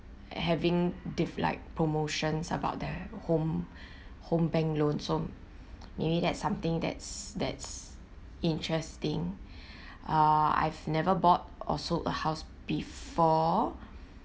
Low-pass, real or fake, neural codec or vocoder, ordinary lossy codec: none; real; none; none